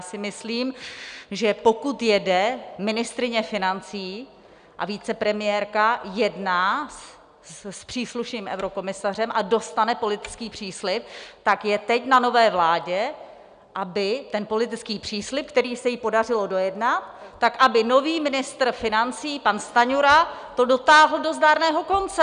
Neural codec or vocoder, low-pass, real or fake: none; 9.9 kHz; real